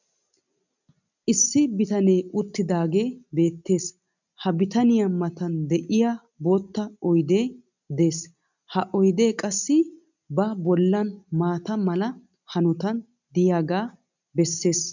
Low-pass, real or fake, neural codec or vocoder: 7.2 kHz; real; none